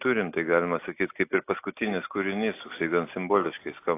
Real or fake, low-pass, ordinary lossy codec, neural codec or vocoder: real; 3.6 kHz; AAC, 24 kbps; none